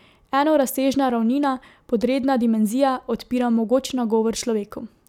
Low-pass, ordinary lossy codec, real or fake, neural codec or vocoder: 19.8 kHz; none; real; none